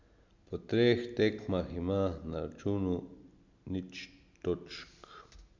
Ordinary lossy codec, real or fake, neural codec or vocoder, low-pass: MP3, 64 kbps; real; none; 7.2 kHz